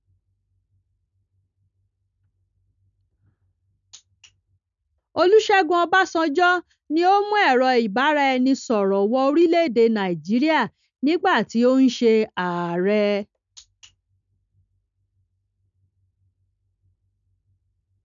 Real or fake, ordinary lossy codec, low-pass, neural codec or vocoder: real; none; 7.2 kHz; none